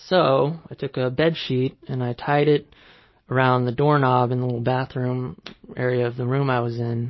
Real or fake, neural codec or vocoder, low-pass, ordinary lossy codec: real; none; 7.2 kHz; MP3, 24 kbps